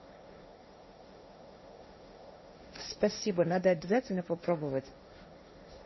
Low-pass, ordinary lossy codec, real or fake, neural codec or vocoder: 7.2 kHz; MP3, 24 kbps; fake; codec, 16 kHz, 1.1 kbps, Voila-Tokenizer